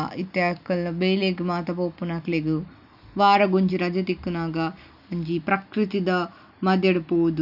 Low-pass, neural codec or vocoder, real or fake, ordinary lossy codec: 5.4 kHz; none; real; none